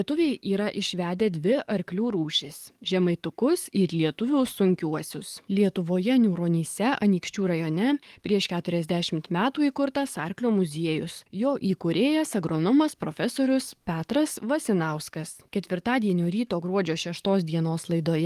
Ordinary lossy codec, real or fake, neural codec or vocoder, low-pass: Opus, 32 kbps; fake; codec, 44.1 kHz, 7.8 kbps, DAC; 14.4 kHz